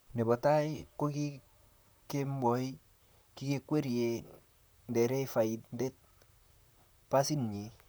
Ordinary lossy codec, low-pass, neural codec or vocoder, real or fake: none; none; vocoder, 44.1 kHz, 128 mel bands, Pupu-Vocoder; fake